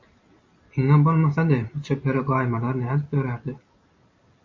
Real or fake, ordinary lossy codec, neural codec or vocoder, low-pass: real; MP3, 32 kbps; none; 7.2 kHz